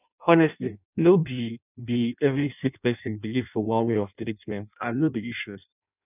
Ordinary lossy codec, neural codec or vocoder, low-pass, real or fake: none; codec, 16 kHz in and 24 kHz out, 0.6 kbps, FireRedTTS-2 codec; 3.6 kHz; fake